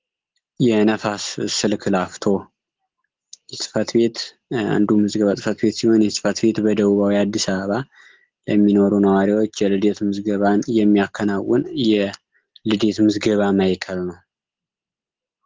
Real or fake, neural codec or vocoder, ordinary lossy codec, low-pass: real; none; Opus, 16 kbps; 7.2 kHz